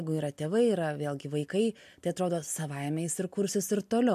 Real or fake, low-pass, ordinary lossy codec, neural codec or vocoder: real; 14.4 kHz; MP3, 64 kbps; none